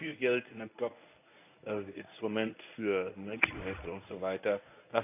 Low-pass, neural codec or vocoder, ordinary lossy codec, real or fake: 3.6 kHz; codec, 24 kHz, 0.9 kbps, WavTokenizer, medium speech release version 1; AAC, 32 kbps; fake